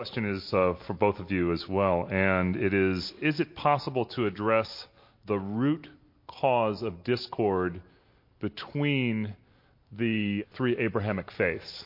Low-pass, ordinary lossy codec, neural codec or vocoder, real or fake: 5.4 kHz; MP3, 32 kbps; none; real